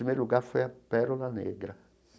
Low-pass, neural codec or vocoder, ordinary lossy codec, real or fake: none; none; none; real